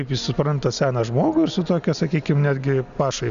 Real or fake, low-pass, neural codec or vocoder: real; 7.2 kHz; none